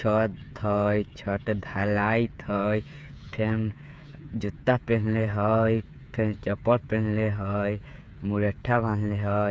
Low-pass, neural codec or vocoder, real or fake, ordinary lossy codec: none; codec, 16 kHz, 8 kbps, FreqCodec, smaller model; fake; none